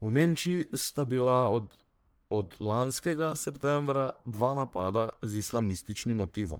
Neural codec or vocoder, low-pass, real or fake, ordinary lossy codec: codec, 44.1 kHz, 1.7 kbps, Pupu-Codec; none; fake; none